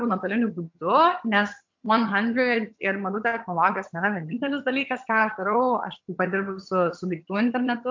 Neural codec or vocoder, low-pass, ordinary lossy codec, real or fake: vocoder, 22.05 kHz, 80 mel bands, HiFi-GAN; 7.2 kHz; MP3, 48 kbps; fake